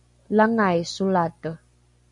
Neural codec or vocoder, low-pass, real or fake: none; 10.8 kHz; real